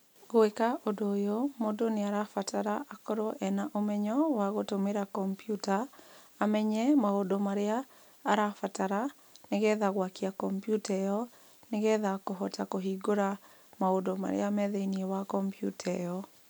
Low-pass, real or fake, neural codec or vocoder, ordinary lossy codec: none; real; none; none